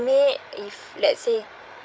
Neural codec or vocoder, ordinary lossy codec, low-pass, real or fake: codec, 16 kHz, 8 kbps, FreqCodec, larger model; none; none; fake